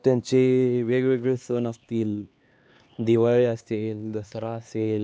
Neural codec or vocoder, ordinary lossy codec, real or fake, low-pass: codec, 16 kHz, 2 kbps, X-Codec, HuBERT features, trained on LibriSpeech; none; fake; none